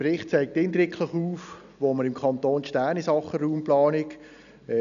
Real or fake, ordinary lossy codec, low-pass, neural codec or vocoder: real; none; 7.2 kHz; none